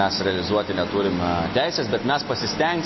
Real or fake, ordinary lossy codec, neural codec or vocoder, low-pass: real; MP3, 24 kbps; none; 7.2 kHz